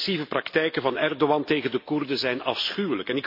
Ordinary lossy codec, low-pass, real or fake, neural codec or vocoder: MP3, 32 kbps; 5.4 kHz; real; none